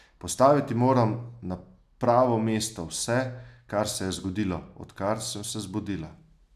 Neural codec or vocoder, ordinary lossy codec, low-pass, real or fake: none; none; 14.4 kHz; real